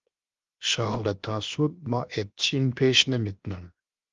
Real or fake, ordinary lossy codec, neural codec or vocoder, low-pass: fake; Opus, 32 kbps; codec, 16 kHz, 0.7 kbps, FocalCodec; 7.2 kHz